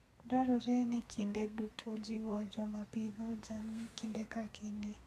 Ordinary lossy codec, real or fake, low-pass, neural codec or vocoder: none; fake; 14.4 kHz; codec, 32 kHz, 1.9 kbps, SNAC